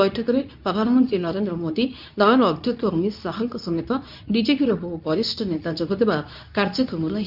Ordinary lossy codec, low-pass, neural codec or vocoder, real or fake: none; 5.4 kHz; codec, 24 kHz, 0.9 kbps, WavTokenizer, medium speech release version 2; fake